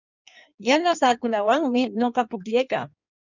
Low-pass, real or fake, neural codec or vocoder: 7.2 kHz; fake; codec, 16 kHz in and 24 kHz out, 1.1 kbps, FireRedTTS-2 codec